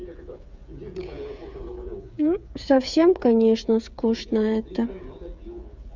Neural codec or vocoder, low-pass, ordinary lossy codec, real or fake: codec, 16 kHz, 8 kbps, FreqCodec, smaller model; 7.2 kHz; none; fake